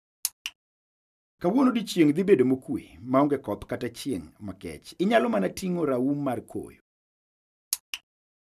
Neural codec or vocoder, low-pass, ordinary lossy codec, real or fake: none; 14.4 kHz; none; real